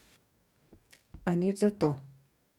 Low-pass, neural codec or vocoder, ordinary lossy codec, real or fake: 19.8 kHz; codec, 44.1 kHz, 2.6 kbps, DAC; none; fake